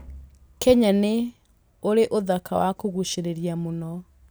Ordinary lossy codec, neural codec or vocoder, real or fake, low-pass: none; none; real; none